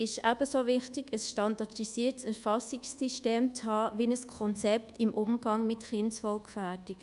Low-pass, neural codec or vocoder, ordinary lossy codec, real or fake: 10.8 kHz; codec, 24 kHz, 1.2 kbps, DualCodec; MP3, 96 kbps; fake